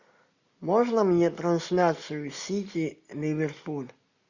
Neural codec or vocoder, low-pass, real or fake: none; 7.2 kHz; real